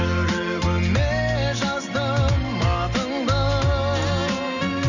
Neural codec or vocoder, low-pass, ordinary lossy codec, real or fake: none; 7.2 kHz; none; real